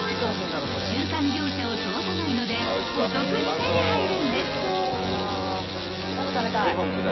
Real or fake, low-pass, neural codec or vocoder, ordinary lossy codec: fake; 7.2 kHz; vocoder, 24 kHz, 100 mel bands, Vocos; MP3, 24 kbps